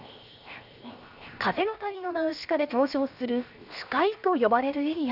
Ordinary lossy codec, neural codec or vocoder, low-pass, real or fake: none; codec, 16 kHz, 0.7 kbps, FocalCodec; 5.4 kHz; fake